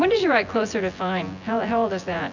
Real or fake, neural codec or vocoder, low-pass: fake; vocoder, 24 kHz, 100 mel bands, Vocos; 7.2 kHz